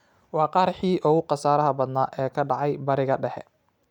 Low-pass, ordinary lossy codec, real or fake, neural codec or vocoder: 19.8 kHz; none; real; none